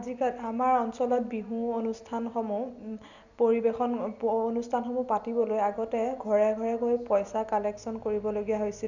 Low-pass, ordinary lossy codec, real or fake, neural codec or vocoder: 7.2 kHz; none; real; none